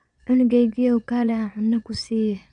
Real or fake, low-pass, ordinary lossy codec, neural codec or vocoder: fake; 9.9 kHz; none; vocoder, 22.05 kHz, 80 mel bands, Vocos